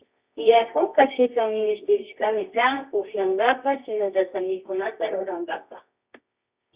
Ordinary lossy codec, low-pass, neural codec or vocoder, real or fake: Opus, 64 kbps; 3.6 kHz; codec, 24 kHz, 0.9 kbps, WavTokenizer, medium music audio release; fake